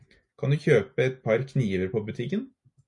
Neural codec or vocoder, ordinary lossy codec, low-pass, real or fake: none; MP3, 48 kbps; 10.8 kHz; real